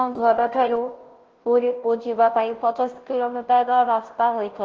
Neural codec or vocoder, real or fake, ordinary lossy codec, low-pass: codec, 16 kHz, 0.5 kbps, FunCodec, trained on Chinese and English, 25 frames a second; fake; Opus, 16 kbps; 7.2 kHz